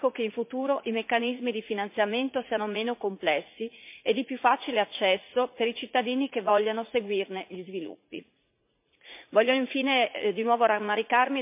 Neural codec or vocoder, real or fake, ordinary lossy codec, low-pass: vocoder, 44.1 kHz, 80 mel bands, Vocos; fake; MP3, 32 kbps; 3.6 kHz